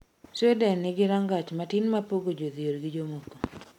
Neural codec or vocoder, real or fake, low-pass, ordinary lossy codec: none; real; 19.8 kHz; MP3, 96 kbps